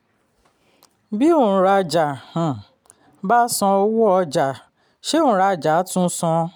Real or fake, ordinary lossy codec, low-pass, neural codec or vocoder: real; none; none; none